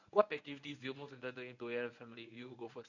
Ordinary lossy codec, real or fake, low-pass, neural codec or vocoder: none; fake; 7.2 kHz; codec, 24 kHz, 0.9 kbps, WavTokenizer, medium speech release version 1